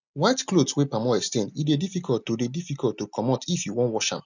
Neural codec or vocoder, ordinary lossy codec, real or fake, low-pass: none; none; real; 7.2 kHz